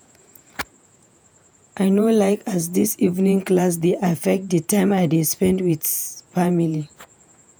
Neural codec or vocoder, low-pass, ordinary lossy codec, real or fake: vocoder, 48 kHz, 128 mel bands, Vocos; none; none; fake